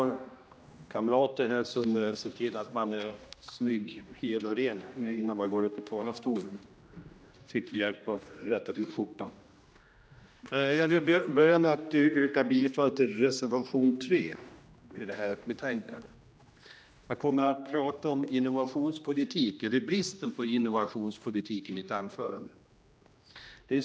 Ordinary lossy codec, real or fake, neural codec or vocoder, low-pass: none; fake; codec, 16 kHz, 1 kbps, X-Codec, HuBERT features, trained on general audio; none